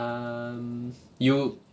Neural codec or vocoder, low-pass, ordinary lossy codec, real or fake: none; none; none; real